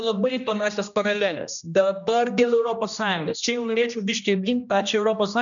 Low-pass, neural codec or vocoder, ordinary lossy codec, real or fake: 7.2 kHz; codec, 16 kHz, 1 kbps, X-Codec, HuBERT features, trained on general audio; MP3, 96 kbps; fake